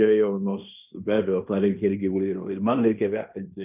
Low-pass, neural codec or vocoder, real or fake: 3.6 kHz; codec, 16 kHz in and 24 kHz out, 0.9 kbps, LongCat-Audio-Codec, fine tuned four codebook decoder; fake